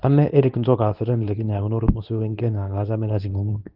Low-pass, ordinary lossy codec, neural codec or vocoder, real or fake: 5.4 kHz; Opus, 24 kbps; codec, 24 kHz, 0.9 kbps, WavTokenizer, medium speech release version 2; fake